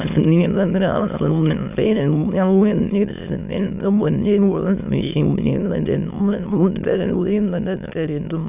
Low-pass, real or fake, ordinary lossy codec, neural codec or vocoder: 3.6 kHz; fake; none; autoencoder, 22.05 kHz, a latent of 192 numbers a frame, VITS, trained on many speakers